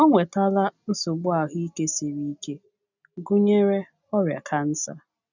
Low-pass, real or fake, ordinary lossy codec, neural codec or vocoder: 7.2 kHz; real; none; none